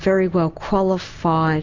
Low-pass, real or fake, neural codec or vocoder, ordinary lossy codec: 7.2 kHz; real; none; MP3, 48 kbps